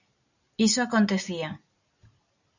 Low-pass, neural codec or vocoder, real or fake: 7.2 kHz; none; real